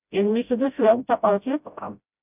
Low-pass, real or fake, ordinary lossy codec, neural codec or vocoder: 3.6 kHz; fake; none; codec, 16 kHz, 0.5 kbps, FreqCodec, smaller model